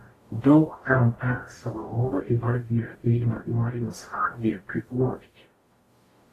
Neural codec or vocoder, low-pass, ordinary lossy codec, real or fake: codec, 44.1 kHz, 0.9 kbps, DAC; 14.4 kHz; AAC, 48 kbps; fake